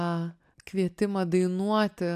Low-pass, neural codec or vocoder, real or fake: 14.4 kHz; none; real